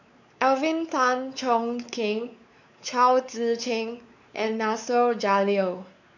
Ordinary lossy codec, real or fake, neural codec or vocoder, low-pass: none; fake; codec, 16 kHz, 4 kbps, X-Codec, WavLM features, trained on Multilingual LibriSpeech; 7.2 kHz